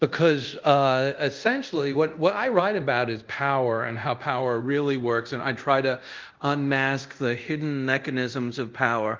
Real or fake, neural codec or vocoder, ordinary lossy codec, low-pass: fake; codec, 24 kHz, 0.5 kbps, DualCodec; Opus, 32 kbps; 7.2 kHz